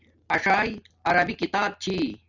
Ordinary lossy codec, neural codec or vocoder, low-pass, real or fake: Opus, 64 kbps; none; 7.2 kHz; real